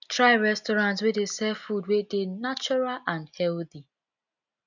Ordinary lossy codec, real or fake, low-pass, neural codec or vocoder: none; real; 7.2 kHz; none